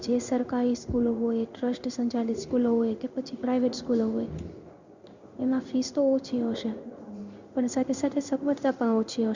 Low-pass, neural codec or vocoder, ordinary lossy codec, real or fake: 7.2 kHz; codec, 16 kHz in and 24 kHz out, 1 kbps, XY-Tokenizer; Opus, 64 kbps; fake